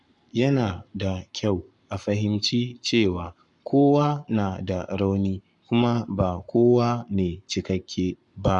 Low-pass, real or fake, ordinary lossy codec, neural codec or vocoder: 10.8 kHz; fake; none; codec, 44.1 kHz, 7.8 kbps, Pupu-Codec